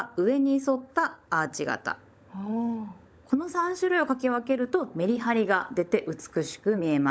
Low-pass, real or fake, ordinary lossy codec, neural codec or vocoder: none; fake; none; codec, 16 kHz, 16 kbps, FunCodec, trained on LibriTTS, 50 frames a second